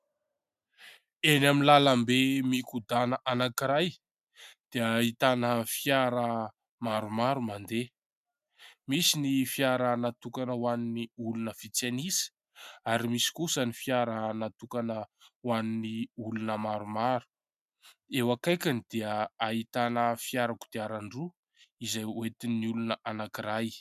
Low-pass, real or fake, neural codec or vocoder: 14.4 kHz; real; none